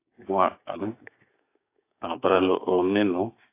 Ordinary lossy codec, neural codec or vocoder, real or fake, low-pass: AAC, 24 kbps; codec, 16 kHz, 6 kbps, DAC; fake; 3.6 kHz